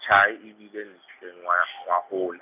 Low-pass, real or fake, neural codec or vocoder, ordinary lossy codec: 3.6 kHz; real; none; none